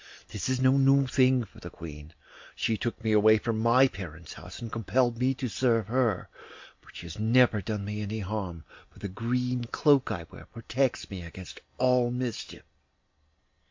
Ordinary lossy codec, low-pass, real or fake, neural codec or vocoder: MP3, 48 kbps; 7.2 kHz; real; none